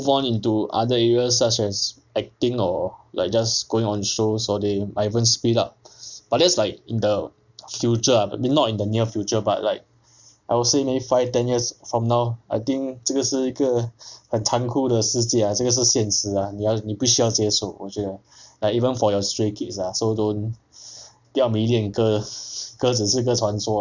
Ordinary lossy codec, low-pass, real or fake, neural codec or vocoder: none; 7.2 kHz; real; none